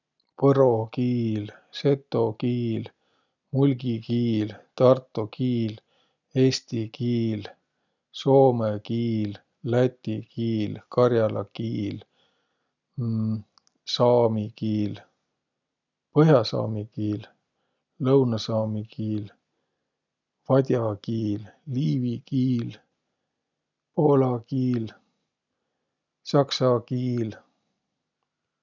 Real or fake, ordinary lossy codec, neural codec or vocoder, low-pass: real; none; none; 7.2 kHz